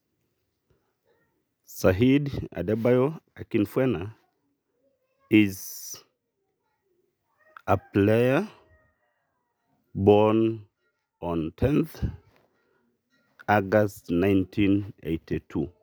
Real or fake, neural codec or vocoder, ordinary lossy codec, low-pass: real; none; none; none